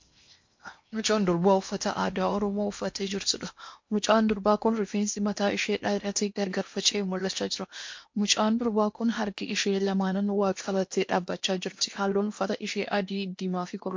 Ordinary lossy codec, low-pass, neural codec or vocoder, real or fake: MP3, 48 kbps; 7.2 kHz; codec, 16 kHz in and 24 kHz out, 0.8 kbps, FocalCodec, streaming, 65536 codes; fake